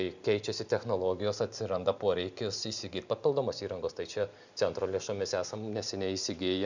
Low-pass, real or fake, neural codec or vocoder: 7.2 kHz; real; none